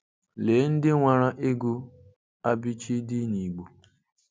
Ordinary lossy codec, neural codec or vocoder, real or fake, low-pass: none; none; real; none